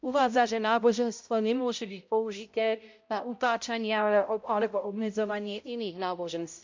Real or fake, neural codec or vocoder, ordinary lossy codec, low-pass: fake; codec, 16 kHz, 0.5 kbps, X-Codec, HuBERT features, trained on balanced general audio; MP3, 64 kbps; 7.2 kHz